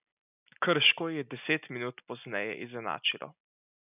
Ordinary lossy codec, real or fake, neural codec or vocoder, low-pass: none; real; none; 3.6 kHz